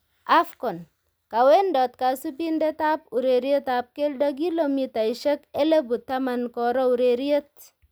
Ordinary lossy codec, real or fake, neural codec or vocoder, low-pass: none; real; none; none